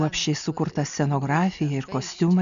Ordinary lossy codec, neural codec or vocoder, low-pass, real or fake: MP3, 96 kbps; none; 7.2 kHz; real